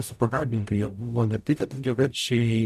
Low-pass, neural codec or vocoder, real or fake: 14.4 kHz; codec, 44.1 kHz, 0.9 kbps, DAC; fake